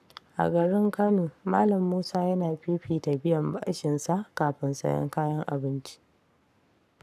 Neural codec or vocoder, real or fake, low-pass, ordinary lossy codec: codec, 44.1 kHz, 7.8 kbps, Pupu-Codec; fake; 14.4 kHz; none